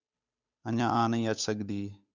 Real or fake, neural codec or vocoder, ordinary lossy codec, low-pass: fake; codec, 16 kHz, 8 kbps, FunCodec, trained on Chinese and English, 25 frames a second; Opus, 64 kbps; 7.2 kHz